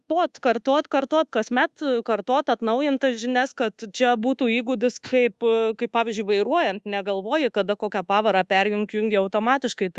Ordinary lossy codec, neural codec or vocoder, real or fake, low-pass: Opus, 64 kbps; codec, 24 kHz, 1.2 kbps, DualCodec; fake; 10.8 kHz